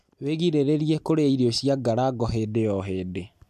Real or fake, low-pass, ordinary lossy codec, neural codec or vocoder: real; 14.4 kHz; MP3, 96 kbps; none